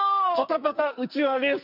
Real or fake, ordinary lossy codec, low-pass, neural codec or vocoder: fake; none; 5.4 kHz; codec, 44.1 kHz, 2.6 kbps, SNAC